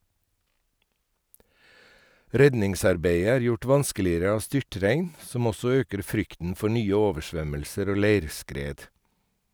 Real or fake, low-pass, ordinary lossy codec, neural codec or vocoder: real; none; none; none